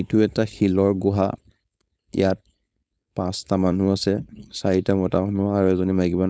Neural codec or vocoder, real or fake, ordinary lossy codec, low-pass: codec, 16 kHz, 4.8 kbps, FACodec; fake; none; none